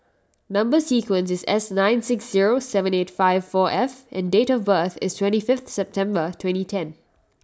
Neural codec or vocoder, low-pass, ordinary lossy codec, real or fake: none; none; none; real